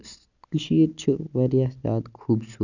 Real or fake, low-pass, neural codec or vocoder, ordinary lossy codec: real; 7.2 kHz; none; none